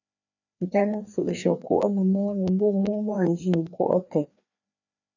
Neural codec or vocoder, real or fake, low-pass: codec, 16 kHz, 2 kbps, FreqCodec, larger model; fake; 7.2 kHz